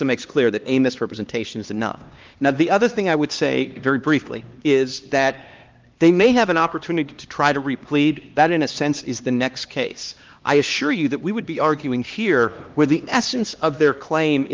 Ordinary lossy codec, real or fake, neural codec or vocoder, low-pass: Opus, 32 kbps; fake; codec, 16 kHz, 2 kbps, X-Codec, HuBERT features, trained on LibriSpeech; 7.2 kHz